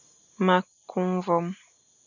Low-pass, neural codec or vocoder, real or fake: 7.2 kHz; none; real